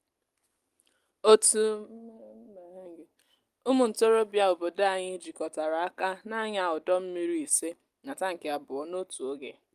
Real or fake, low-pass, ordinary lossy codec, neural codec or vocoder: real; 14.4 kHz; Opus, 32 kbps; none